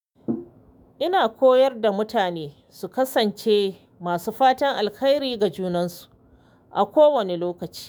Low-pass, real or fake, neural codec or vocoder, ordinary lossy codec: none; fake; autoencoder, 48 kHz, 128 numbers a frame, DAC-VAE, trained on Japanese speech; none